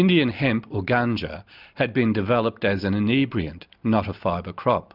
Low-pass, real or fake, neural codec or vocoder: 5.4 kHz; real; none